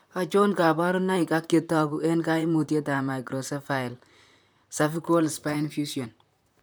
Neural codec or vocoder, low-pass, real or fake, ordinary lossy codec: vocoder, 44.1 kHz, 128 mel bands, Pupu-Vocoder; none; fake; none